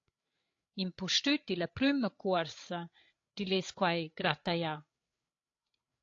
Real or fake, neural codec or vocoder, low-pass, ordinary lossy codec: fake; codec, 16 kHz, 8 kbps, FreqCodec, larger model; 7.2 kHz; AAC, 48 kbps